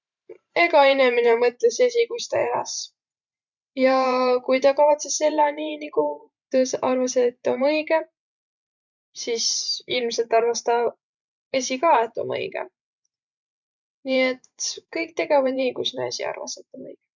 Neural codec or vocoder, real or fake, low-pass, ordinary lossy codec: vocoder, 44.1 kHz, 128 mel bands every 512 samples, BigVGAN v2; fake; 7.2 kHz; none